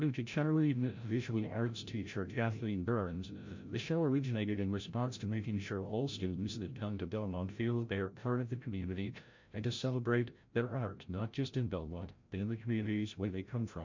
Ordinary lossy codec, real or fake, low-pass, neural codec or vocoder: MP3, 48 kbps; fake; 7.2 kHz; codec, 16 kHz, 0.5 kbps, FreqCodec, larger model